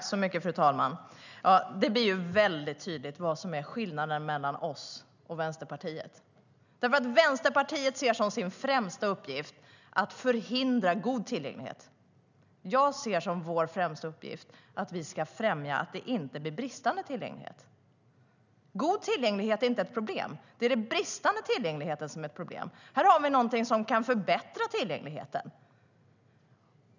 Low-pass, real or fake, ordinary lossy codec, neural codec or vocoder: 7.2 kHz; real; none; none